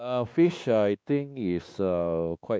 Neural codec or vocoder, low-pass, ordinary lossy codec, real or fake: codec, 16 kHz, 2 kbps, X-Codec, WavLM features, trained on Multilingual LibriSpeech; none; none; fake